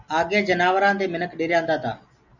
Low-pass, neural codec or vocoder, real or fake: 7.2 kHz; none; real